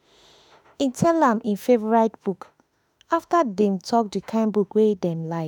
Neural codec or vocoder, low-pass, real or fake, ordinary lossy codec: autoencoder, 48 kHz, 32 numbers a frame, DAC-VAE, trained on Japanese speech; none; fake; none